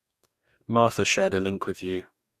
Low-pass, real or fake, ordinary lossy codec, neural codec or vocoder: 14.4 kHz; fake; Opus, 64 kbps; codec, 44.1 kHz, 2.6 kbps, DAC